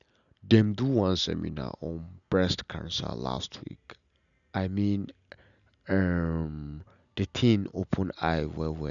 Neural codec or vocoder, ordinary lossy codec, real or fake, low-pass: none; none; real; 7.2 kHz